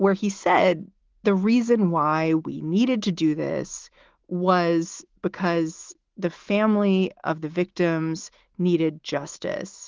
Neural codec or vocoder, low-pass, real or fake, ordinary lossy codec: autoencoder, 48 kHz, 128 numbers a frame, DAC-VAE, trained on Japanese speech; 7.2 kHz; fake; Opus, 16 kbps